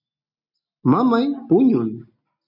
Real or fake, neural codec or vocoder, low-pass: real; none; 5.4 kHz